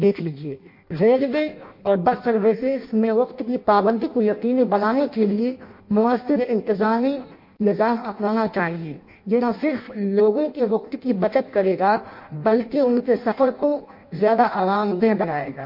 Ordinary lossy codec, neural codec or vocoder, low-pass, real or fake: MP3, 32 kbps; codec, 16 kHz in and 24 kHz out, 0.6 kbps, FireRedTTS-2 codec; 5.4 kHz; fake